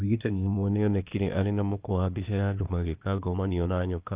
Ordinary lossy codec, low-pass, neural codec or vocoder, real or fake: Opus, 24 kbps; 3.6 kHz; codec, 16 kHz, 0.8 kbps, ZipCodec; fake